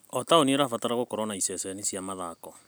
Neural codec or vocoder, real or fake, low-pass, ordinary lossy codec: none; real; none; none